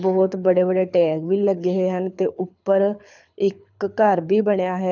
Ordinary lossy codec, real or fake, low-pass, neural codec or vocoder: none; fake; 7.2 kHz; codec, 24 kHz, 6 kbps, HILCodec